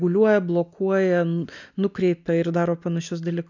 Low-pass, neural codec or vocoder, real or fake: 7.2 kHz; none; real